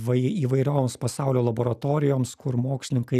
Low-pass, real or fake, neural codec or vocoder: 14.4 kHz; real; none